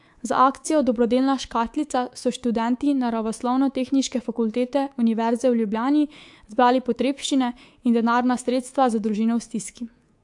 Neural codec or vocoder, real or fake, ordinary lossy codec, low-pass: codec, 24 kHz, 3.1 kbps, DualCodec; fake; AAC, 64 kbps; 10.8 kHz